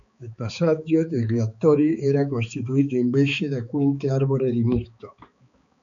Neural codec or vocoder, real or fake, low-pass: codec, 16 kHz, 4 kbps, X-Codec, HuBERT features, trained on balanced general audio; fake; 7.2 kHz